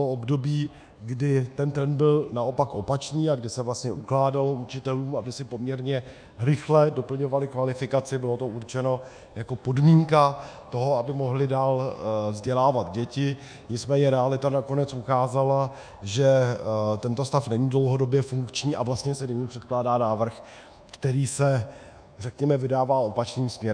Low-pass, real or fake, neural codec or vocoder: 9.9 kHz; fake; codec, 24 kHz, 1.2 kbps, DualCodec